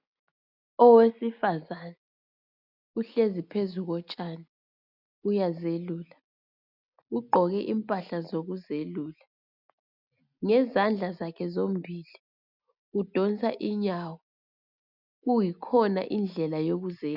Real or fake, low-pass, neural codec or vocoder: real; 5.4 kHz; none